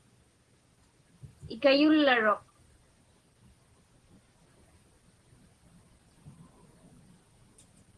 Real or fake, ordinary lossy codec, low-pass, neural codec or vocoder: real; Opus, 16 kbps; 9.9 kHz; none